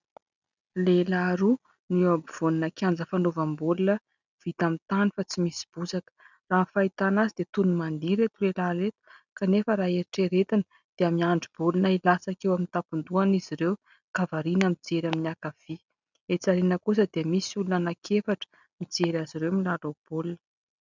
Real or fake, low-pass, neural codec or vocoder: real; 7.2 kHz; none